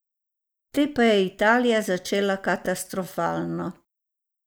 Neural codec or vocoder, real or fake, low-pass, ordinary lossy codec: none; real; none; none